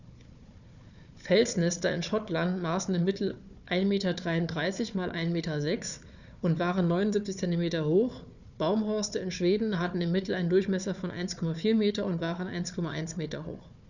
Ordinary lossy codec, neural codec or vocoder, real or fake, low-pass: none; codec, 16 kHz, 4 kbps, FunCodec, trained on Chinese and English, 50 frames a second; fake; 7.2 kHz